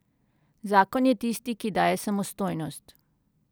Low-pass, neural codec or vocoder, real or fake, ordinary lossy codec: none; none; real; none